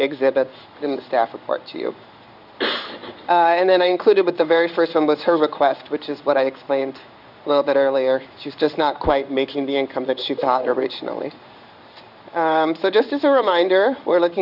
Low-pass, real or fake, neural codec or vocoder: 5.4 kHz; fake; codec, 16 kHz in and 24 kHz out, 1 kbps, XY-Tokenizer